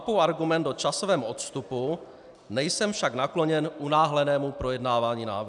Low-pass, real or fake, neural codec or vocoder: 10.8 kHz; real; none